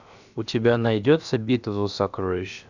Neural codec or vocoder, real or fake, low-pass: codec, 16 kHz, about 1 kbps, DyCAST, with the encoder's durations; fake; 7.2 kHz